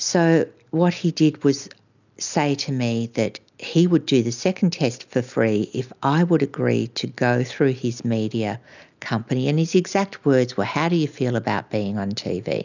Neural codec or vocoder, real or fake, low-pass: none; real; 7.2 kHz